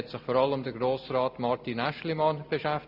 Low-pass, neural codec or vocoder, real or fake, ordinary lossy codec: 5.4 kHz; none; real; MP3, 48 kbps